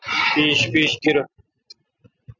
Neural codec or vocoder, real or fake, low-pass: none; real; 7.2 kHz